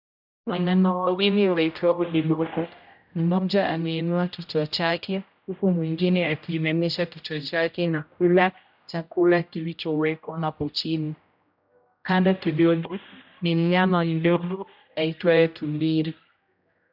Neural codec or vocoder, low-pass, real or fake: codec, 16 kHz, 0.5 kbps, X-Codec, HuBERT features, trained on general audio; 5.4 kHz; fake